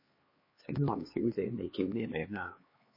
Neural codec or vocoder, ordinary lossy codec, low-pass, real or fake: codec, 16 kHz, 4 kbps, X-Codec, HuBERT features, trained on LibriSpeech; MP3, 24 kbps; 5.4 kHz; fake